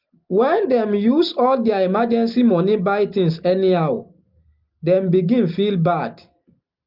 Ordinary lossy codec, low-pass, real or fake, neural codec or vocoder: Opus, 24 kbps; 5.4 kHz; real; none